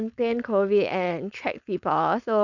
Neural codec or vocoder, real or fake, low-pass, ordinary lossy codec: codec, 16 kHz, 4.8 kbps, FACodec; fake; 7.2 kHz; none